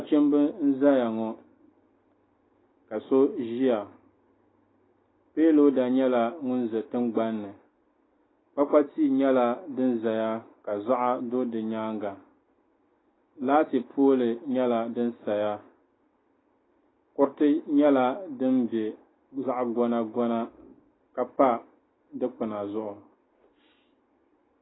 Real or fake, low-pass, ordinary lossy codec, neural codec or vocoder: real; 7.2 kHz; AAC, 16 kbps; none